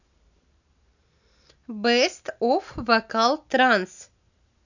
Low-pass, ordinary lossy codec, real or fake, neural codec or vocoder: 7.2 kHz; none; real; none